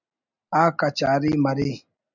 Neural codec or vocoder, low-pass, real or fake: none; 7.2 kHz; real